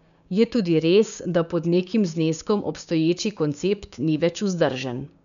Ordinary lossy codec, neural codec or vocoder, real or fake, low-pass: none; codec, 16 kHz, 6 kbps, DAC; fake; 7.2 kHz